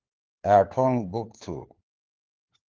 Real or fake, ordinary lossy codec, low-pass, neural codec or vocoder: fake; Opus, 16 kbps; 7.2 kHz; codec, 16 kHz, 4 kbps, FunCodec, trained on LibriTTS, 50 frames a second